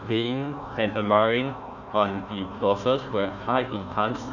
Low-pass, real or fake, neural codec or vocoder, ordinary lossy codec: 7.2 kHz; fake; codec, 16 kHz, 1 kbps, FunCodec, trained on Chinese and English, 50 frames a second; none